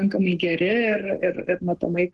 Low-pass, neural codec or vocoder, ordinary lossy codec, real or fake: 10.8 kHz; codec, 44.1 kHz, 7.8 kbps, Pupu-Codec; Opus, 16 kbps; fake